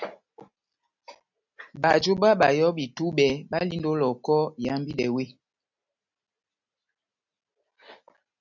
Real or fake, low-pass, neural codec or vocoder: real; 7.2 kHz; none